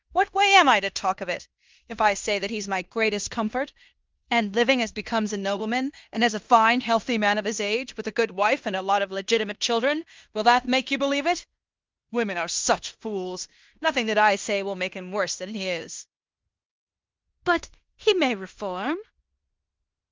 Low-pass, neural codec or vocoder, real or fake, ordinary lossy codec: 7.2 kHz; codec, 16 kHz in and 24 kHz out, 0.9 kbps, LongCat-Audio-Codec, fine tuned four codebook decoder; fake; Opus, 24 kbps